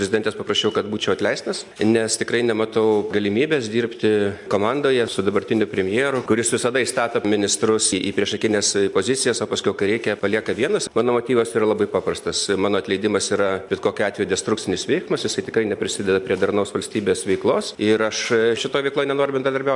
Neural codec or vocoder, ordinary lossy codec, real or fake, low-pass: none; MP3, 64 kbps; real; 10.8 kHz